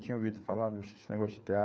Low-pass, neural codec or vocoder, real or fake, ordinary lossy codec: none; codec, 16 kHz, 4 kbps, FreqCodec, larger model; fake; none